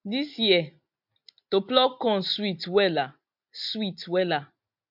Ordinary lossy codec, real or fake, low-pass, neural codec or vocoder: MP3, 48 kbps; real; 5.4 kHz; none